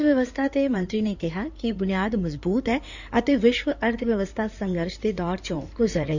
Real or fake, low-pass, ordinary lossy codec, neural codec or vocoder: fake; 7.2 kHz; none; codec, 16 kHz in and 24 kHz out, 2.2 kbps, FireRedTTS-2 codec